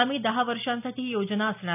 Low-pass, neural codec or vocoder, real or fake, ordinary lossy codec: 3.6 kHz; none; real; none